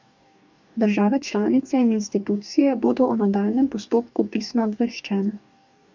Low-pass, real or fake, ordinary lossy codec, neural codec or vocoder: 7.2 kHz; fake; none; codec, 44.1 kHz, 2.6 kbps, DAC